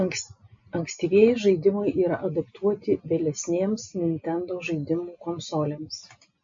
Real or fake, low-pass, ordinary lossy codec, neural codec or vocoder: real; 7.2 kHz; MP3, 48 kbps; none